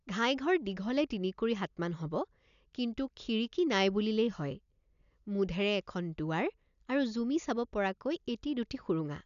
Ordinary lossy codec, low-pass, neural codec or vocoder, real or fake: none; 7.2 kHz; none; real